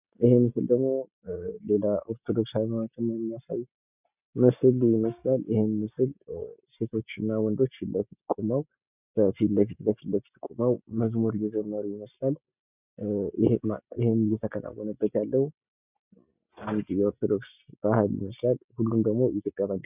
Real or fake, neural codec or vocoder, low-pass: real; none; 3.6 kHz